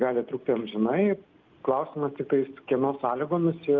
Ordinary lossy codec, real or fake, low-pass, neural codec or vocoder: Opus, 24 kbps; real; 7.2 kHz; none